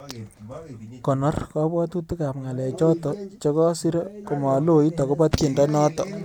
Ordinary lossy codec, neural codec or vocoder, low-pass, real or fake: none; none; 19.8 kHz; real